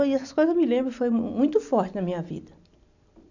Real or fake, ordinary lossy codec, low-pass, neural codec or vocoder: real; none; 7.2 kHz; none